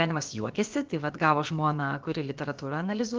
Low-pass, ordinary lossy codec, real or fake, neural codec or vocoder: 7.2 kHz; Opus, 32 kbps; fake; codec, 16 kHz, about 1 kbps, DyCAST, with the encoder's durations